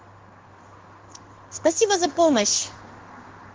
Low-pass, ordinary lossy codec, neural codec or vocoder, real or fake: 7.2 kHz; Opus, 16 kbps; codec, 16 kHz in and 24 kHz out, 1 kbps, XY-Tokenizer; fake